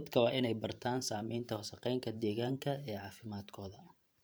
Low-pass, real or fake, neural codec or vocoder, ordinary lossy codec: none; fake; vocoder, 44.1 kHz, 128 mel bands every 512 samples, BigVGAN v2; none